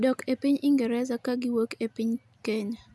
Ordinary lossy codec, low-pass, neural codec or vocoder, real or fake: none; none; none; real